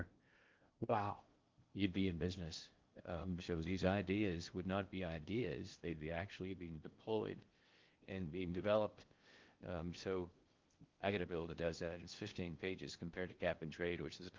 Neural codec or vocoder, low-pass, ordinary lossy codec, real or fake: codec, 16 kHz in and 24 kHz out, 0.6 kbps, FocalCodec, streaming, 4096 codes; 7.2 kHz; Opus, 32 kbps; fake